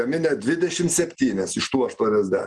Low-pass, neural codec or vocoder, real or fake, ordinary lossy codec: 10.8 kHz; none; real; Opus, 24 kbps